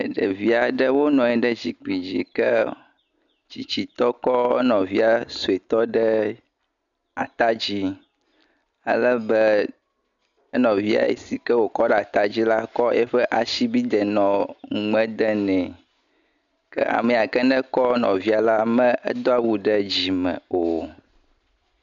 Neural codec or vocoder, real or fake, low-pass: none; real; 7.2 kHz